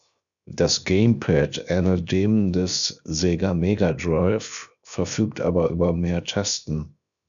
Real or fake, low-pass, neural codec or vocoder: fake; 7.2 kHz; codec, 16 kHz, 0.7 kbps, FocalCodec